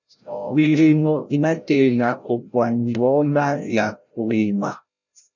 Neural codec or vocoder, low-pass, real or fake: codec, 16 kHz, 0.5 kbps, FreqCodec, larger model; 7.2 kHz; fake